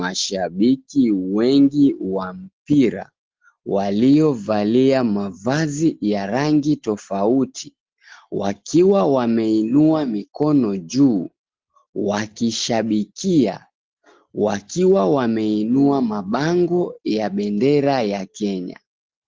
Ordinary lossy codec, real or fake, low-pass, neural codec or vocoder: Opus, 16 kbps; real; 7.2 kHz; none